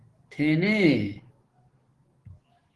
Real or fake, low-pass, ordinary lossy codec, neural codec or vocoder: fake; 10.8 kHz; Opus, 16 kbps; codec, 44.1 kHz, 7.8 kbps, DAC